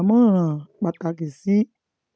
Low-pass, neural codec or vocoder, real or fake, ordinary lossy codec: none; none; real; none